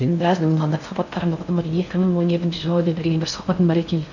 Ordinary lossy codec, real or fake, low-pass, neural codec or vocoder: none; fake; 7.2 kHz; codec, 16 kHz in and 24 kHz out, 0.6 kbps, FocalCodec, streaming, 4096 codes